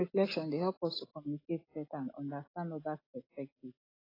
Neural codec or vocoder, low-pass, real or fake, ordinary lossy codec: none; 5.4 kHz; real; AAC, 24 kbps